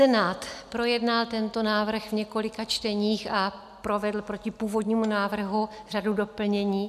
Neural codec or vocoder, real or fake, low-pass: none; real; 14.4 kHz